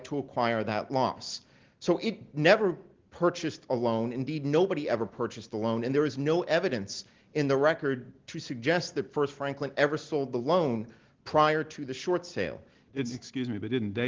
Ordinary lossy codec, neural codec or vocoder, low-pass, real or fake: Opus, 16 kbps; none; 7.2 kHz; real